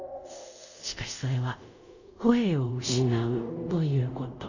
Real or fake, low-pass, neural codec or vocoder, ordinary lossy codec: fake; 7.2 kHz; codec, 24 kHz, 0.5 kbps, DualCodec; none